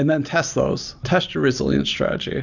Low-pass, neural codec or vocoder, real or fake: 7.2 kHz; none; real